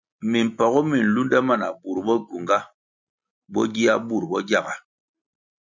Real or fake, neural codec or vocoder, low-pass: real; none; 7.2 kHz